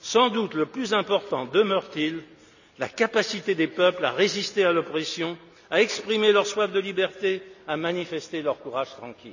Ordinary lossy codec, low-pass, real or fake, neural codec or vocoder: none; 7.2 kHz; real; none